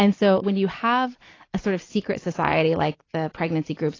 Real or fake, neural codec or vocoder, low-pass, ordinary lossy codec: real; none; 7.2 kHz; AAC, 32 kbps